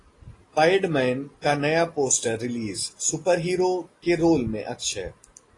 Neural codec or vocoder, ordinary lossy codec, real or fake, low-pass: none; AAC, 32 kbps; real; 10.8 kHz